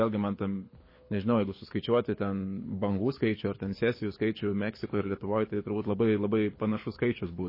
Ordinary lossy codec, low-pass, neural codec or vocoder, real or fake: MP3, 24 kbps; 5.4 kHz; codec, 24 kHz, 6 kbps, HILCodec; fake